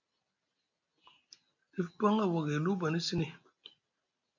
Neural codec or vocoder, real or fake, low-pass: none; real; 7.2 kHz